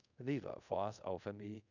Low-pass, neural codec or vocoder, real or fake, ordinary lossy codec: 7.2 kHz; codec, 24 kHz, 0.5 kbps, DualCodec; fake; none